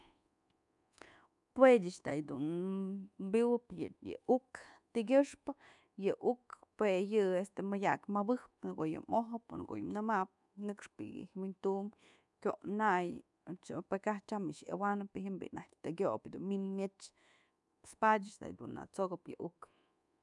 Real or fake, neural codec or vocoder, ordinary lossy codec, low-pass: fake; codec, 24 kHz, 1.2 kbps, DualCodec; none; 10.8 kHz